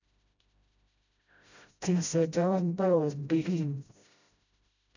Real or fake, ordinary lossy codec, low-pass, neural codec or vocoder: fake; none; 7.2 kHz; codec, 16 kHz, 0.5 kbps, FreqCodec, smaller model